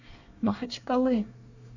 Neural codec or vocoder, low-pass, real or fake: codec, 24 kHz, 1 kbps, SNAC; 7.2 kHz; fake